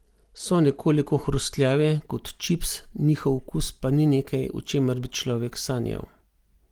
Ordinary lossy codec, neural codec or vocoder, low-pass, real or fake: Opus, 24 kbps; none; 19.8 kHz; real